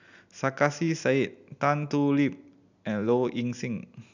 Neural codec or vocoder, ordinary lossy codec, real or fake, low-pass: none; none; real; 7.2 kHz